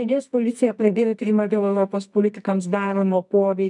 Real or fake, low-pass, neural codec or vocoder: fake; 10.8 kHz; codec, 24 kHz, 0.9 kbps, WavTokenizer, medium music audio release